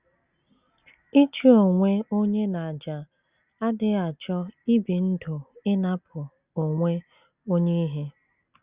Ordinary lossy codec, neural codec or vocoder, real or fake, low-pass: Opus, 64 kbps; none; real; 3.6 kHz